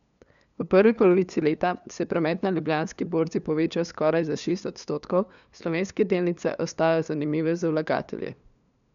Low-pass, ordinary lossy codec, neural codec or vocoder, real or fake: 7.2 kHz; none; codec, 16 kHz, 2 kbps, FunCodec, trained on LibriTTS, 25 frames a second; fake